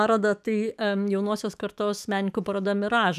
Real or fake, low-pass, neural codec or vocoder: fake; 14.4 kHz; autoencoder, 48 kHz, 128 numbers a frame, DAC-VAE, trained on Japanese speech